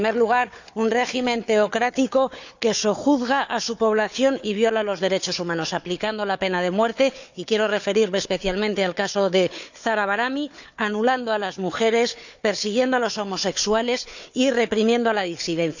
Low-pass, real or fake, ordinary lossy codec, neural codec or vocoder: 7.2 kHz; fake; none; codec, 16 kHz, 4 kbps, FunCodec, trained on Chinese and English, 50 frames a second